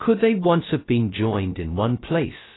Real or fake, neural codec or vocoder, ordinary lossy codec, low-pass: fake; codec, 16 kHz, 0.2 kbps, FocalCodec; AAC, 16 kbps; 7.2 kHz